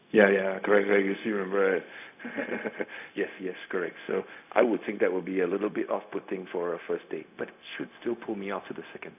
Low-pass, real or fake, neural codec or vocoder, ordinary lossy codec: 3.6 kHz; fake; codec, 16 kHz, 0.4 kbps, LongCat-Audio-Codec; none